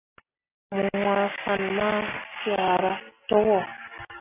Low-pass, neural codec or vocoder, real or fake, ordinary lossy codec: 3.6 kHz; none; real; AAC, 24 kbps